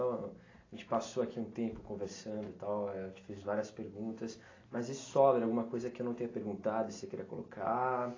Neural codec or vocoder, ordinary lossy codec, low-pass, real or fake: none; AAC, 32 kbps; 7.2 kHz; real